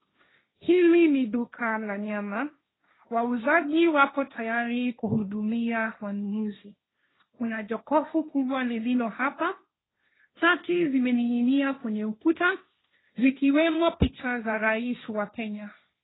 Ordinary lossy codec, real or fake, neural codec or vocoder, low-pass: AAC, 16 kbps; fake; codec, 16 kHz, 1.1 kbps, Voila-Tokenizer; 7.2 kHz